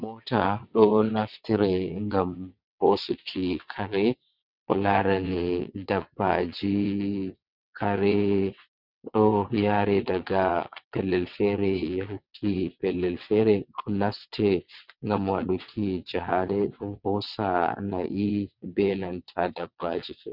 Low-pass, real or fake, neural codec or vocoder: 5.4 kHz; fake; vocoder, 22.05 kHz, 80 mel bands, WaveNeXt